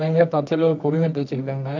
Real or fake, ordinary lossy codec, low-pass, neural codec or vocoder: fake; none; 7.2 kHz; codec, 24 kHz, 0.9 kbps, WavTokenizer, medium music audio release